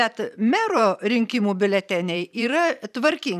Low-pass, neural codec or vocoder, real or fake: 14.4 kHz; vocoder, 44.1 kHz, 128 mel bands every 512 samples, BigVGAN v2; fake